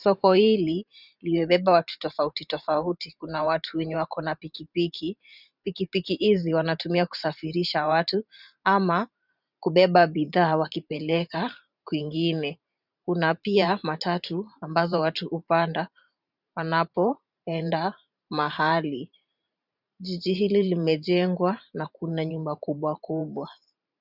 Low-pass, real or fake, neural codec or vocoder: 5.4 kHz; fake; vocoder, 44.1 kHz, 128 mel bands every 512 samples, BigVGAN v2